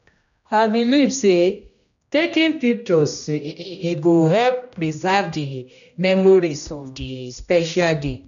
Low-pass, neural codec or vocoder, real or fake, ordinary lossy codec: 7.2 kHz; codec, 16 kHz, 1 kbps, X-Codec, HuBERT features, trained on general audio; fake; none